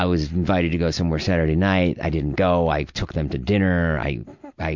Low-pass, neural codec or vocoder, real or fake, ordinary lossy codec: 7.2 kHz; none; real; MP3, 64 kbps